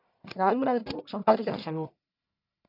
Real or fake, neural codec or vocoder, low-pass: fake; codec, 44.1 kHz, 1.7 kbps, Pupu-Codec; 5.4 kHz